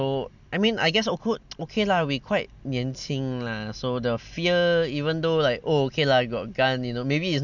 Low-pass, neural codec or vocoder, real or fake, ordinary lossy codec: 7.2 kHz; none; real; none